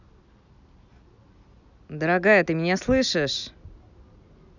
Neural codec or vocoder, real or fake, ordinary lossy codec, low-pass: none; real; none; 7.2 kHz